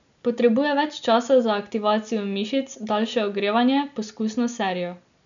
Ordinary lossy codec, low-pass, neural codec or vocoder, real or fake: none; 7.2 kHz; none; real